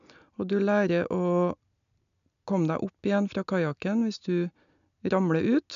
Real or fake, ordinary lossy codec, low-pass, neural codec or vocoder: real; none; 7.2 kHz; none